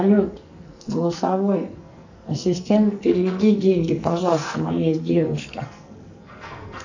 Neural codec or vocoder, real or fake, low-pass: codec, 44.1 kHz, 2.6 kbps, SNAC; fake; 7.2 kHz